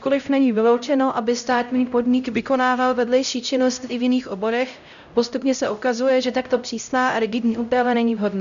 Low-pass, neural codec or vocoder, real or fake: 7.2 kHz; codec, 16 kHz, 0.5 kbps, X-Codec, HuBERT features, trained on LibriSpeech; fake